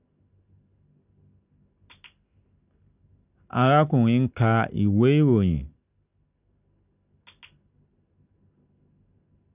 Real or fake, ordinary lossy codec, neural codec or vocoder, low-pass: real; none; none; 3.6 kHz